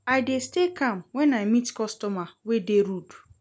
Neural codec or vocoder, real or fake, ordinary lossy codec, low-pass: none; real; none; none